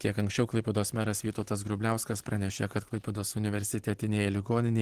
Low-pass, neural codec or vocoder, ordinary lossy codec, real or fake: 14.4 kHz; none; Opus, 16 kbps; real